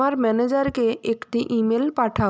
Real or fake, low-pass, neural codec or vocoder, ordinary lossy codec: real; none; none; none